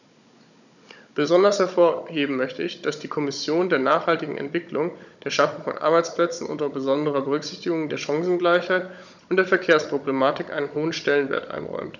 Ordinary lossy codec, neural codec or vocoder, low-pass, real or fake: none; codec, 16 kHz, 16 kbps, FunCodec, trained on Chinese and English, 50 frames a second; 7.2 kHz; fake